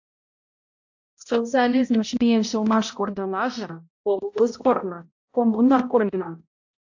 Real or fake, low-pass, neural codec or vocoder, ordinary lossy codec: fake; 7.2 kHz; codec, 16 kHz, 0.5 kbps, X-Codec, HuBERT features, trained on balanced general audio; AAC, 48 kbps